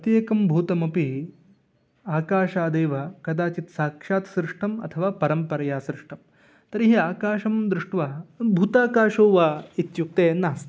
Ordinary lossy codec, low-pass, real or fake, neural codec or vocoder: none; none; real; none